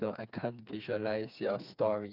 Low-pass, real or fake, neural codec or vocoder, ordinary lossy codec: 5.4 kHz; fake; codec, 16 kHz, 4 kbps, FreqCodec, smaller model; none